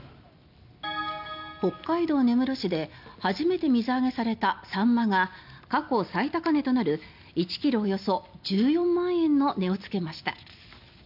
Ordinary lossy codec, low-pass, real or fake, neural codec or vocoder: none; 5.4 kHz; real; none